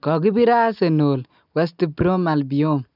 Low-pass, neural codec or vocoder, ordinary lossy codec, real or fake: 5.4 kHz; none; none; real